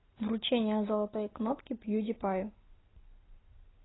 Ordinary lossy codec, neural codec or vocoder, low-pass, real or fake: AAC, 16 kbps; none; 7.2 kHz; real